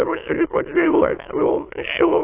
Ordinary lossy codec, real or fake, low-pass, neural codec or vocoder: AAC, 24 kbps; fake; 3.6 kHz; autoencoder, 22.05 kHz, a latent of 192 numbers a frame, VITS, trained on many speakers